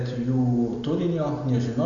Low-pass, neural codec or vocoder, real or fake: 7.2 kHz; none; real